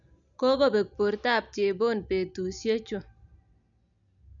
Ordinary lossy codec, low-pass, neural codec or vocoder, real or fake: none; 7.2 kHz; none; real